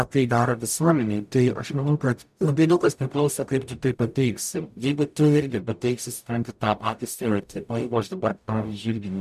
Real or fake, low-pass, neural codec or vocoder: fake; 14.4 kHz; codec, 44.1 kHz, 0.9 kbps, DAC